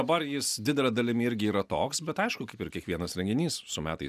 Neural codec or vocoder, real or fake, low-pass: none; real; 14.4 kHz